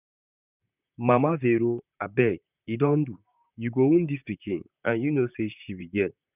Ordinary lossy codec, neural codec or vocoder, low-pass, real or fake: none; vocoder, 44.1 kHz, 128 mel bands, Pupu-Vocoder; 3.6 kHz; fake